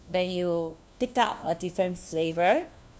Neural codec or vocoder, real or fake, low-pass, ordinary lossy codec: codec, 16 kHz, 1 kbps, FunCodec, trained on LibriTTS, 50 frames a second; fake; none; none